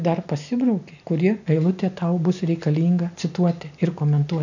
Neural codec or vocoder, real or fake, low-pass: none; real; 7.2 kHz